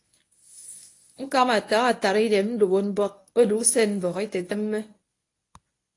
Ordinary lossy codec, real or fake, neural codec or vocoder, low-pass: AAC, 48 kbps; fake; codec, 24 kHz, 0.9 kbps, WavTokenizer, medium speech release version 1; 10.8 kHz